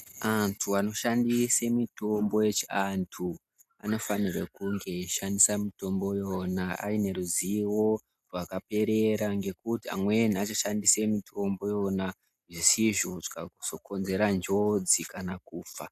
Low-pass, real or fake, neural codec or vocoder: 14.4 kHz; real; none